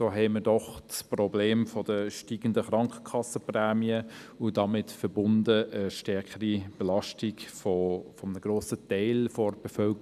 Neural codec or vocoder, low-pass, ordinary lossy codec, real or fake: none; 14.4 kHz; none; real